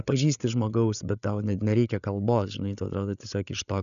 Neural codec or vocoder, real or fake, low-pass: codec, 16 kHz, 8 kbps, FreqCodec, larger model; fake; 7.2 kHz